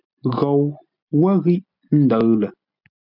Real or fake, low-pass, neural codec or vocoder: real; 5.4 kHz; none